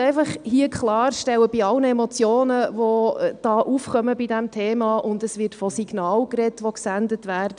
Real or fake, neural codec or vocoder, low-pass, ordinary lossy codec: real; none; 9.9 kHz; none